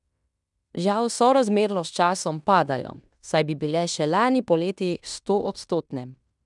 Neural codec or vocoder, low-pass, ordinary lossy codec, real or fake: codec, 16 kHz in and 24 kHz out, 0.9 kbps, LongCat-Audio-Codec, fine tuned four codebook decoder; 10.8 kHz; none; fake